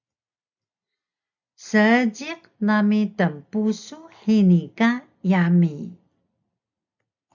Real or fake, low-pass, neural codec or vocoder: real; 7.2 kHz; none